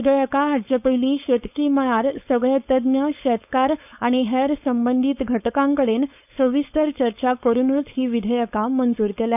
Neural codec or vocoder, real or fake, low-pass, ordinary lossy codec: codec, 16 kHz, 4.8 kbps, FACodec; fake; 3.6 kHz; none